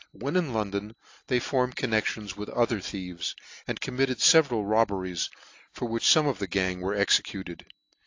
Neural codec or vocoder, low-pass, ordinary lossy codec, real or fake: none; 7.2 kHz; AAC, 48 kbps; real